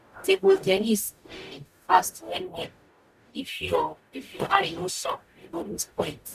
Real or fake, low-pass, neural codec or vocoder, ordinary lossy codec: fake; 14.4 kHz; codec, 44.1 kHz, 0.9 kbps, DAC; none